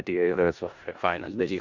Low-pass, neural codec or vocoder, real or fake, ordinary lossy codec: 7.2 kHz; codec, 16 kHz in and 24 kHz out, 0.4 kbps, LongCat-Audio-Codec, four codebook decoder; fake; Opus, 64 kbps